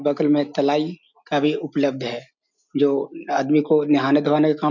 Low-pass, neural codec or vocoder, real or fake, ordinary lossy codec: 7.2 kHz; none; real; none